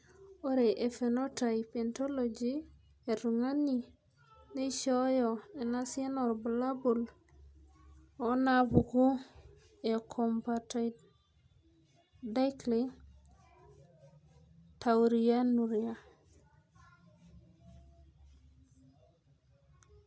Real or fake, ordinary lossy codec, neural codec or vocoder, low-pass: real; none; none; none